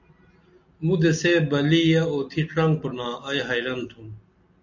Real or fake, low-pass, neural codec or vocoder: real; 7.2 kHz; none